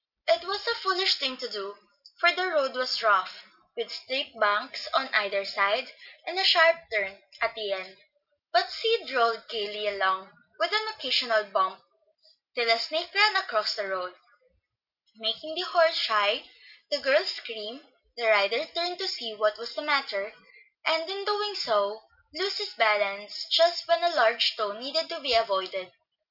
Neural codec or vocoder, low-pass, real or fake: none; 5.4 kHz; real